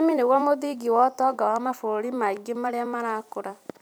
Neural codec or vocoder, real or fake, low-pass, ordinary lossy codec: vocoder, 44.1 kHz, 128 mel bands every 256 samples, BigVGAN v2; fake; none; none